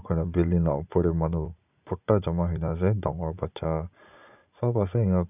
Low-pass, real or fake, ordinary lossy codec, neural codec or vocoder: 3.6 kHz; fake; none; vocoder, 44.1 kHz, 80 mel bands, Vocos